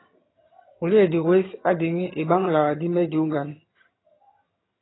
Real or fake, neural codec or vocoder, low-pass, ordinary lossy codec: fake; vocoder, 22.05 kHz, 80 mel bands, HiFi-GAN; 7.2 kHz; AAC, 16 kbps